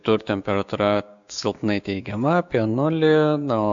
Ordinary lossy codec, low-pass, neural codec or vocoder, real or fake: AAC, 64 kbps; 7.2 kHz; codec, 16 kHz, 6 kbps, DAC; fake